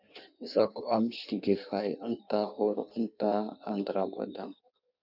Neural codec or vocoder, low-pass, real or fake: codec, 16 kHz in and 24 kHz out, 1.1 kbps, FireRedTTS-2 codec; 5.4 kHz; fake